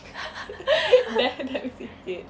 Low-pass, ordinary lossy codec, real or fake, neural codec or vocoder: none; none; real; none